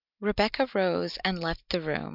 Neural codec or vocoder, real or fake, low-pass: none; real; 5.4 kHz